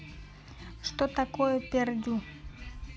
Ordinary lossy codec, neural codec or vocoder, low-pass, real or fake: none; none; none; real